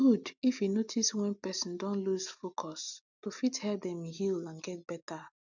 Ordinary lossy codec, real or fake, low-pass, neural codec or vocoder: none; real; 7.2 kHz; none